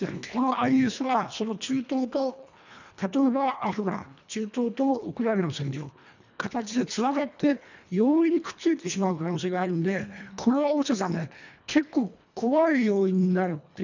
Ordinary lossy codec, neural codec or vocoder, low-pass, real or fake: none; codec, 24 kHz, 1.5 kbps, HILCodec; 7.2 kHz; fake